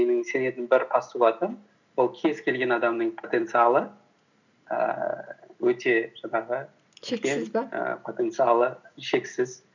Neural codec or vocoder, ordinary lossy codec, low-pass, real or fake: none; none; 7.2 kHz; real